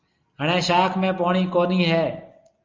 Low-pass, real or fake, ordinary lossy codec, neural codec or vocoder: 7.2 kHz; real; Opus, 32 kbps; none